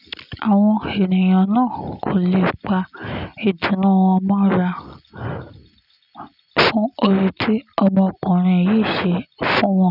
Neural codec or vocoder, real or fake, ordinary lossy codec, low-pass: none; real; none; 5.4 kHz